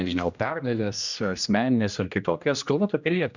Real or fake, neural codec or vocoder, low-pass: fake; codec, 16 kHz, 1 kbps, X-Codec, HuBERT features, trained on general audio; 7.2 kHz